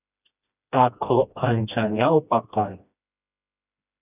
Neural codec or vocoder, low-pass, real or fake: codec, 16 kHz, 1 kbps, FreqCodec, smaller model; 3.6 kHz; fake